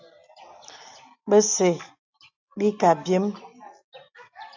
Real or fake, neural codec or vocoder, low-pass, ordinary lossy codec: real; none; 7.2 kHz; AAC, 48 kbps